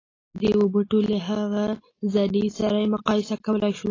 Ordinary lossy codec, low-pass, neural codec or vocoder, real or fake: AAC, 32 kbps; 7.2 kHz; none; real